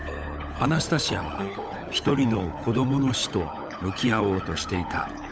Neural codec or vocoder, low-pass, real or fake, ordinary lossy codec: codec, 16 kHz, 16 kbps, FunCodec, trained on LibriTTS, 50 frames a second; none; fake; none